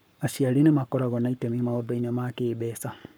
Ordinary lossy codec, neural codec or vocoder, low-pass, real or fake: none; codec, 44.1 kHz, 7.8 kbps, Pupu-Codec; none; fake